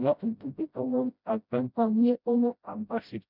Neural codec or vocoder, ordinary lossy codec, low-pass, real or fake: codec, 16 kHz, 0.5 kbps, FreqCodec, smaller model; none; 5.4 kHz; fake